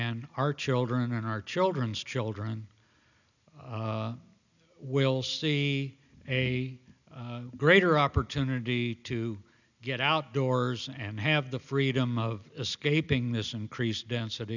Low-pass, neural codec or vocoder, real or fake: 7.2 kHz; none; real